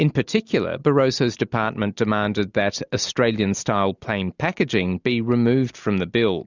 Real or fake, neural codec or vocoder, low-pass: real; none; 7.2 kHz